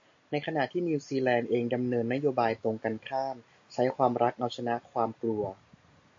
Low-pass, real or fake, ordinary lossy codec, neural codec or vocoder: 7.2 kHz; real; AAC, 48 kbps; none